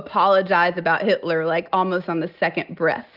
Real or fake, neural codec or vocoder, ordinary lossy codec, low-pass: real; none; Opus, 32 kbps; 5.4 kHz